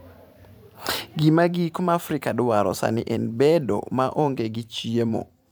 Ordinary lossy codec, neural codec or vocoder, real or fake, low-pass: none; vocoder, 44.1 kHz, 128 mel bands every 512 samples, BigVGAN v2; fake; none